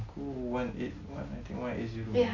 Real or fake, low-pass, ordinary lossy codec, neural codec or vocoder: real; 7.2 kHz; none; none